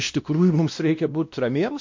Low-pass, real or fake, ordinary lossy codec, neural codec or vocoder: 7.2 kHz; fake; MP3, 48 kbps; codec, 16 kHz, 1 kbps, X-Codec, WavLM features, trained on Multilingual LibriSpeech